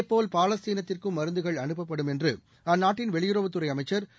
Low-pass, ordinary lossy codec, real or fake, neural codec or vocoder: none; none; real; none